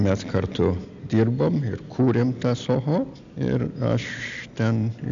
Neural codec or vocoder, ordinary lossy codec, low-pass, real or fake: none; AAC, 64 kbps; 7.2 kHz; real